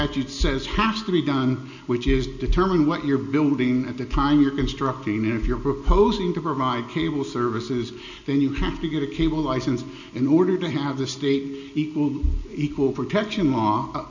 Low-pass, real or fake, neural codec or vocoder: 7.2 kHz; real; none